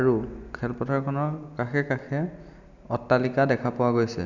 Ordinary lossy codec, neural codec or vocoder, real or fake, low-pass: none; none; real; 7.2 kHz